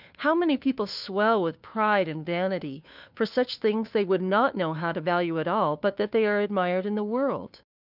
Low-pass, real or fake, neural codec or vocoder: 5.4 kHz; fake; codec, 16 kHz, 2 kbps, FunCodec, trained on Chinese and English, 25 frames a second